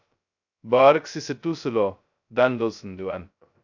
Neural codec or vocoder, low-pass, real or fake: codec, 16 kHz, 0.2 kbps, FocalCodec; 7.2 kHz; fake